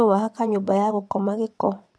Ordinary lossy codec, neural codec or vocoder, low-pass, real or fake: none; vocoder, 22.05 kHz, 80 mel bands, WaveNeXt; none; fake